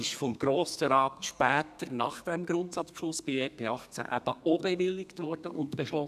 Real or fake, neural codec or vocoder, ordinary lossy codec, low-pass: fake; codec, 32 kHz, 1.9 kbps, SNAC; none; 14.4 kHz